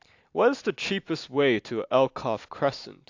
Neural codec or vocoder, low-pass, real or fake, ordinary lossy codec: none; 7.2 kHz; real; AAC, 48 kbps